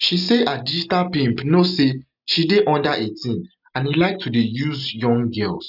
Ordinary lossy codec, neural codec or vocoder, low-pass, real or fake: none; none; 5.4 kHz; real